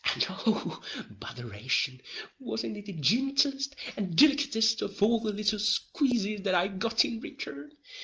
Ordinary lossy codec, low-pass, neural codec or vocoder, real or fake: Opus, 24 kbps; 7.2 kHz; none; real